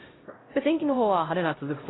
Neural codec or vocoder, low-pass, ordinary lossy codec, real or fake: codec, 16 kHz, 0.5 kbps, X-Codec, HuBERT features, trained on LibriSpeech; 7.2 kHz; AAC, 16 kbps; fake